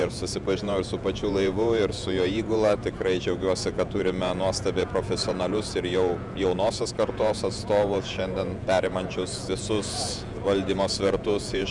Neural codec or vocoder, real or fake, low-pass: vocoder, 48 kHz, 128 mel bands, Vocos; fake; 10.8 kHz